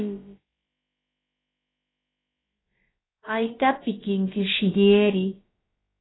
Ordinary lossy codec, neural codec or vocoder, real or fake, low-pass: AAC, 16 kbps; codec, 16 kHz, about 1 kbps, DyCAST, with the encoder's durations; fake; 7.2 kHz